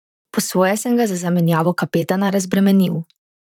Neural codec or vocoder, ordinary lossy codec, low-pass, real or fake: vocoder, 44.1 kHz, 128 mel bands, Pupu-Vocoder; none; 19.8 kHz; fake